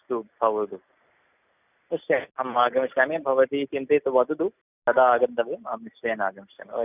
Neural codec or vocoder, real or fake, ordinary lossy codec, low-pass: none; real; none; 3.6 kHz